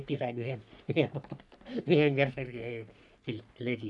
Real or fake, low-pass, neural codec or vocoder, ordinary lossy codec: fake; 10.8 kHz; codec, 44.1 kHz, 3.4 kbps, Pupu-Codec; none